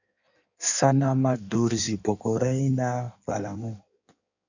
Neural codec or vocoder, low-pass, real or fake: codec, 16 kHz in and 24 kHz out, 1.1 kbps, FireRedTTS-2 codec; 7.2 kHz; fake